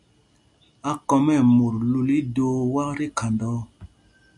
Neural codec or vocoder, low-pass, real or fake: none; 10.8 kHz; real